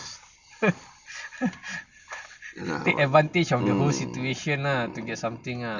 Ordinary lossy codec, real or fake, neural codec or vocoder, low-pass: none; real; none; 7.2 kHz